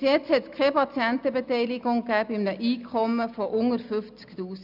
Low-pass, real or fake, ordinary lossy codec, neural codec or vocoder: 5.4 kHz; real; none; none